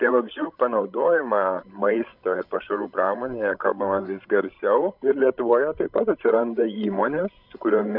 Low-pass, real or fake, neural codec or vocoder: 5.4 kHz; fake; codec, 16 kHz, 16 kbps, FreqCodec, larger model